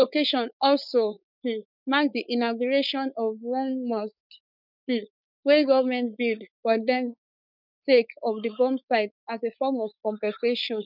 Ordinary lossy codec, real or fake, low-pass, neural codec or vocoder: none; fake; 5.4 kHz; codec, 16 kHz, 4.8 kbps, FACodec